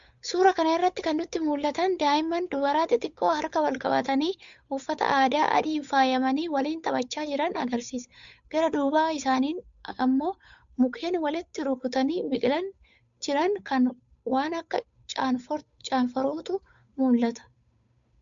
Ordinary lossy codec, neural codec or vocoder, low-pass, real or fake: MP3, 64 kbps; codec, 16 kHz, 8 kbps, FunCodec, trained on Chinese and English, 25 frames a second; 7.2 kHz; fake